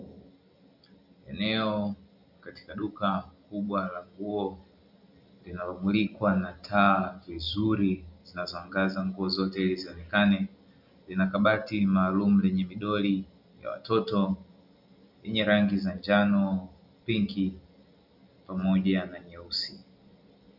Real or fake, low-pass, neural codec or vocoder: real; 5.4 kHz; none